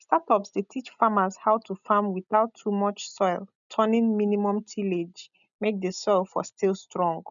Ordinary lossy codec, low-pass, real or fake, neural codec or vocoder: none; 7.2 kHz; real; none